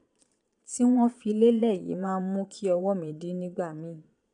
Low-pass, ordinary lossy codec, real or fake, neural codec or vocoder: 9.9 kHz; none; fake; vocoder, 22.05 kHz, 80 mel bands, Vocos